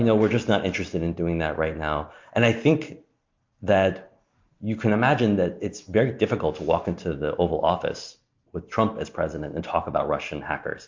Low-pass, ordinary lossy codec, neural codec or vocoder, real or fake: 7.2 kHz; MP3, 48 kbps; none; real